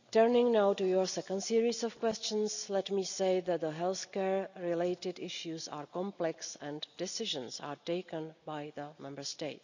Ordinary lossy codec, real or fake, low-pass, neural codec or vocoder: none; real; 7.2 kHz; none